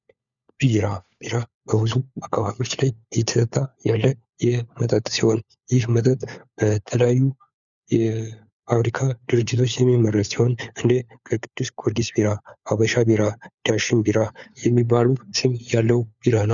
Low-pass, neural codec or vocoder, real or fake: 7.2 kHz; codec, 16 kHz, 4 kbps, FunCodec, trained on LibriTTS, 50 frames a second; fake